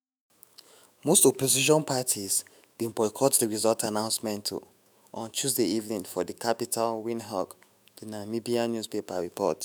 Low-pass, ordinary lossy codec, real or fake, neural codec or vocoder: none; none; fake; autoencoder, 48 kHz, 128 numbers a frame, DAC-VAE, trained on Japanese speech